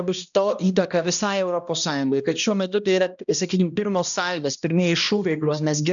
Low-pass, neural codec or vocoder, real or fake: 7.2 kHz; codec, 16 kHz, 1 kbps, X-Codec, HuBERT features, trained on balanced general audio; fake